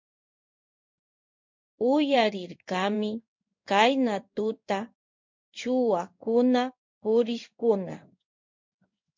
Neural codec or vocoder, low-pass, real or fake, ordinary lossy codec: codec, 16 kHz in and 24 kHz out, 1 kbps, XY-Tokenizer; 7.2 kHz; fake; MP3, 48 kbps